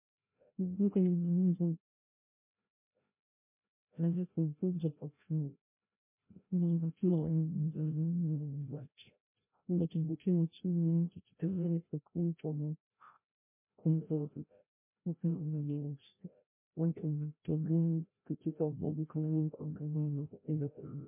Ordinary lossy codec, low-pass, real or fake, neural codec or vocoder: MP3, 24 kbps; 3.6 kHz; fake; codec, 16 kHz, 0.5 kbps, FreqCodec, larger model